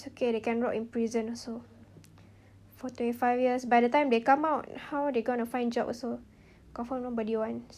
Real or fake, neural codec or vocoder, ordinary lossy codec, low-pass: real; none; none; 14.4 kHz